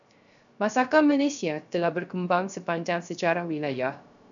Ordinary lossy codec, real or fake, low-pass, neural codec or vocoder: MP3, 96 kbps; fake; 7.2 kHz; codec, 16 kHz, 0.3 kbps, FocalCodec